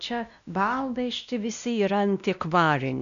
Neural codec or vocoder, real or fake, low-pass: codec, 16 kHz, 1 kbps, X-Codec, WavLM features, trained on Multilingual LibriSpeech; fake; 7.2 kHz